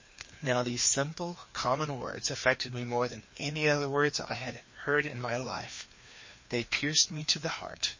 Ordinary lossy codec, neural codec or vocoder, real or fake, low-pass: MP3, 32 kbps; codec, 16 kHz, 2 kbps, FreqCodec, larger model; fake; 7.2 kHz